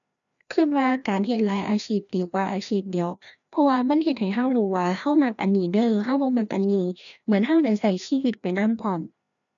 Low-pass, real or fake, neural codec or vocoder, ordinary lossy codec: 7.2 kHz; fake; codec, 16 kHz, 1 kbps, FreqCodec, larger model; none